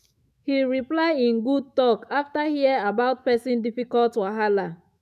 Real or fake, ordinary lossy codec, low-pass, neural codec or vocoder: fake; none; 14.4 kHz; autoencoder, 48 kHz, 128 numbers a frame, DAC-VAE, trained on Japanese speech